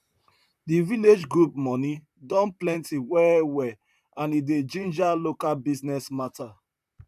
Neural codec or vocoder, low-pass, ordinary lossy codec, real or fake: vocoder, 44.1 kHz, 128 mel bands, Pupu-Vocoder; 14.4 kHz; none; fake